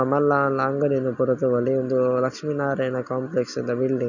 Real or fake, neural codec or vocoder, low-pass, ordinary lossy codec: real; none; 7.2 kHz; none